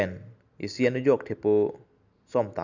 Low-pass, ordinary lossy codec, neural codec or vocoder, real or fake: 7.2 kHz; none; none; real